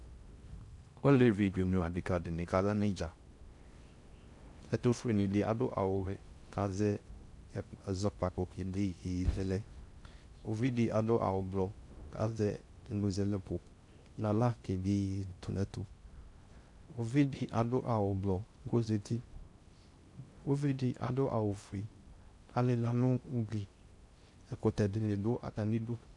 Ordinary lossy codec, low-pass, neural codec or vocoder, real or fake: AAC, 64 kbps; 10.8 kHz; codec, 16 kHz in and 24 kHz out, 0.6 kbps, FocalCodec, streaming, 4096 codes; fake